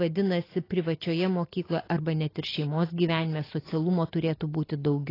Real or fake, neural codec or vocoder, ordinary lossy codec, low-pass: real; none; AAC, 24 kbps; 5.4 kHz